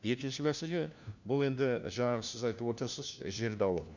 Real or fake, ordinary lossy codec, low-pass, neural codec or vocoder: fake; none; 7.2 kHz; codec, 16 kHz, 1 kbps, FunCodec, trained on LibriTTS, 50 frames a second